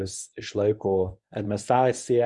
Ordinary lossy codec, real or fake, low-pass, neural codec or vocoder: Opus, 64 kbps; fake; 10.8 kHz; codec, 24 kHz, 0.9 kbps, WavTokenizer, medium speech release version 1